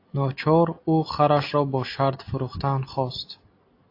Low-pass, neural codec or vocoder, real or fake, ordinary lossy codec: 5.4 kHz; none; real; AAC, 32 kbps